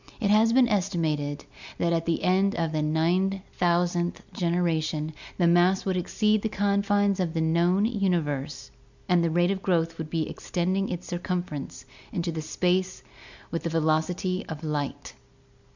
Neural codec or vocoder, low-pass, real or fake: none; 7.2 kHz; real